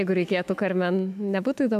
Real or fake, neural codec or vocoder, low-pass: fake; autoencoder, 48 kHz, 128 numbers a frame, DAC-VAE, trained on Japanese speech; 14.4 kHz